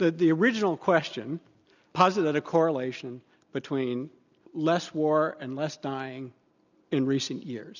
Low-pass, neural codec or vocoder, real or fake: 7.2 kHz; none; real